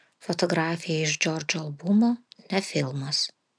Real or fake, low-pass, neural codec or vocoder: real; 9.9 kHz; none